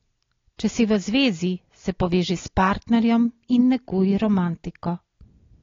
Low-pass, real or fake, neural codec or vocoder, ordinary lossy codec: 7.2 kHz; real; none; AAC, 32 kbps